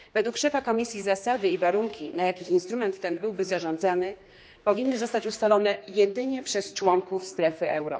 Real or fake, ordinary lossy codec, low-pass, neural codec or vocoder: fake; none; none; codec, 16 kHz, 2 kbps, X-Codec, HuBERT features, trained on general audio